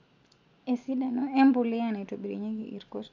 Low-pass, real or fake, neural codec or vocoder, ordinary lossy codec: 7.2 kHz; real; none; none